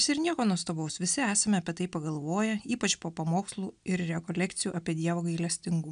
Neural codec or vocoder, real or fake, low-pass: none; real; 9.9 kHz